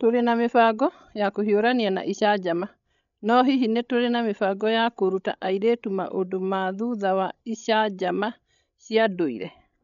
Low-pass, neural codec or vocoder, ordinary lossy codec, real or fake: 7.2 kHz; codec, 16 kHz, 16 kbps, FreqCodec, larger model; none; fake